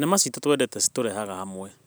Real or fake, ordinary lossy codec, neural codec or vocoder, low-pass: real; none; none; none